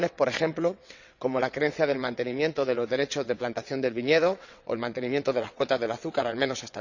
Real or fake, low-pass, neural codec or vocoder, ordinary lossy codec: fake; 7.2 kHz; vocoder, 22.05 kHz, 80 mel bands, WaveNeXt; none